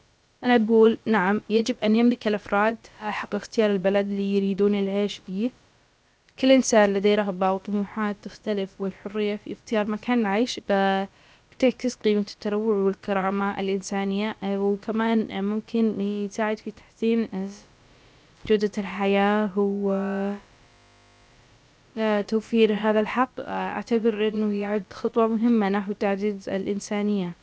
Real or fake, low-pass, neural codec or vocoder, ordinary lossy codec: fake; none; codec, 16 kHz, about 1 kbps, DyCAST, with the encoder's durations; none